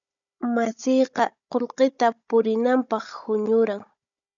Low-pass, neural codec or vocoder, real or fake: 7.2 kHz; codec, 16 kHz, 16 kbps, FunCodec, trained on Chinese and English, 50 frames a second; fake